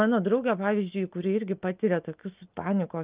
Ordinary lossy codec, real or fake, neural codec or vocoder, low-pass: Opus, 24 kbps; real; none; 3.6 kHz